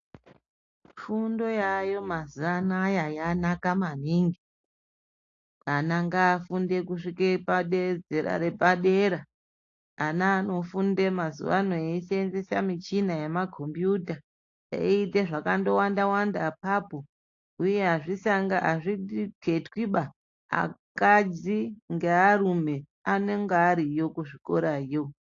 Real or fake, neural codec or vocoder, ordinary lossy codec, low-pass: real; none; AAC, 48 kbps; 7.2 kHz